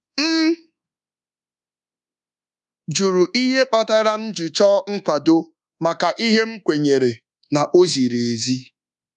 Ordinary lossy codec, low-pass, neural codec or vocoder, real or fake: none; 10.8 kHz; codec, 24 kHz, 1.2 kbps, DualCodec; fake